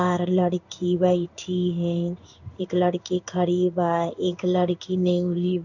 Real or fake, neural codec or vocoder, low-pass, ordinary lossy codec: fake; codec, 16 kHz in and 24 kHz out, 1 kbps, XY-Tokenizer; 7.2 kHz; none